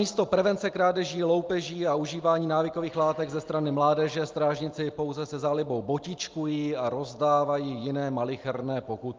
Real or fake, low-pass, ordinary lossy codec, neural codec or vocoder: real; 7.2 kHz; Opus, 24 kbps; none